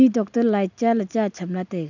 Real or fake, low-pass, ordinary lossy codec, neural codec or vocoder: real; 7.2 kHz; none; none